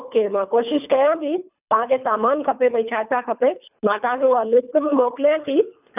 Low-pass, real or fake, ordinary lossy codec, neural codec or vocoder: 3.6 kHz; fake; none; codec, 24 kHz, 3 kbps, HILCodec